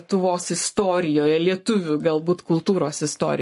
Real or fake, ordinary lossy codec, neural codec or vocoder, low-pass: fake; MP3, 48 kbps; codec, 44.1 kHz, 7.8 kbps, Pupu-Codec; 14.4 kHz